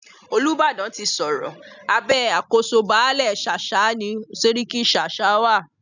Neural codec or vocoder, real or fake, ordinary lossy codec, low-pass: none; real; none; 7.2 kHz